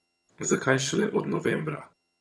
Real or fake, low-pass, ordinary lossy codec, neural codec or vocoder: fake; none; none; vocoder, 22.05 kHz, 80 mel bands, HiFi-GAN